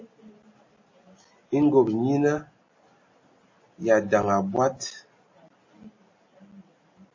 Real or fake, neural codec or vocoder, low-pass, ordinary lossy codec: real; none; 7.2 kHz; MP3, 32 kbps